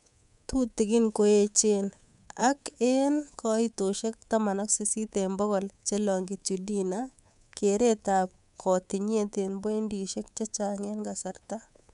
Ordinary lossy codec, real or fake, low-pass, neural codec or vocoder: none; fake; 10.8 kHz; codec, 24 kHz, 3.1 kbps, DualCodec